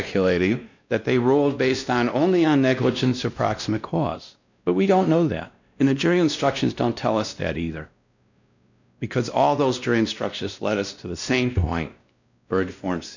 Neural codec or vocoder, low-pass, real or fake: codec, 16 kHz, 1 kbps, X-Codec, WavLM features, trained on Multilingual LibriSpeech; 7.2 kHz; fake